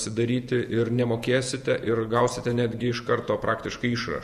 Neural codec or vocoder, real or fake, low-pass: vocoder, 48 kHz, 128 mel bands, Vocos; fake; 14.4 kHz